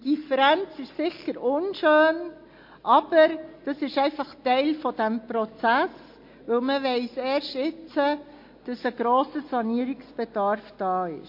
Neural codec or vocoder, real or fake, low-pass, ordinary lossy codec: none; real; 5.4 kHz; MP3, 32 kbps